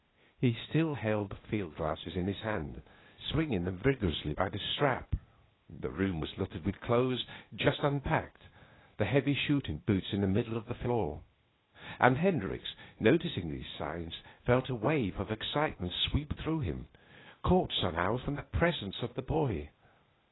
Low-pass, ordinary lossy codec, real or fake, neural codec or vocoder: 7.2 kHz; AAC, 16 kbps; fake; codec, 16 kHz, 0.8 kbps, ZipCodec